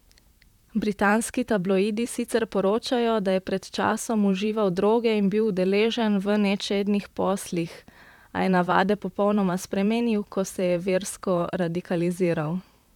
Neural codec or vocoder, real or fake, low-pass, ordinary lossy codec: vocoder, 44.1 kHz, 128 mel bands, Pupu-Vocoder; fake; 19.8 kHz; none